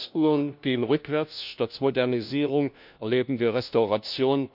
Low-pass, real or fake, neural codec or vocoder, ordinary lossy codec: 5.4 kHz; fake; codec, 16 kHz, 1 kbps, FunCodec, trained on LibriTTS, 50 frames a second; none